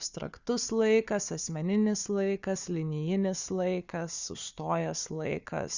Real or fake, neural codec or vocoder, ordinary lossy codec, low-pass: fake; codec, 16 kHz, 4 kbps, FunCodec, trained on Chinese and English, 50 frames a second; Opus, 64 kbps; 7.2 kHz